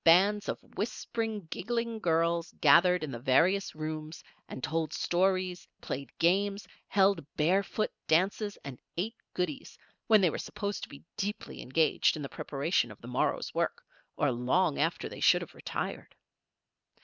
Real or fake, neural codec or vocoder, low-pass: real; none; 7.2 kHz